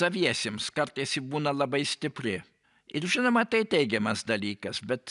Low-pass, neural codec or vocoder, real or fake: 10.8 kHz; none; real